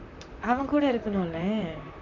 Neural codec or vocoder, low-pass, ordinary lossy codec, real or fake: vocoder, 44.1 kHz, 128 mel bands, Pupu-Vocoder; 7.2 kHz; none; fake